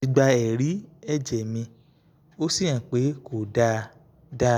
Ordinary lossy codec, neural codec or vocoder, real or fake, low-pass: none; none; real; 19.8 kHz